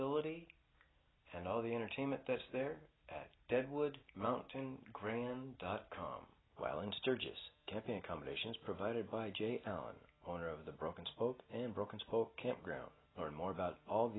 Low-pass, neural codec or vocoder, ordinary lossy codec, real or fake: 7.2 kHz; none; AAC, 16 kbps; real